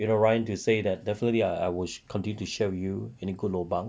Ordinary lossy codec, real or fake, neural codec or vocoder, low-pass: none; real; none; none